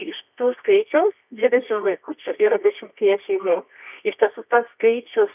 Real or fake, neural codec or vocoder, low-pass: fake; codec, 24 kHz, 0.9 kbps, WavTokenizer, medium music audio release; 3.6 kHz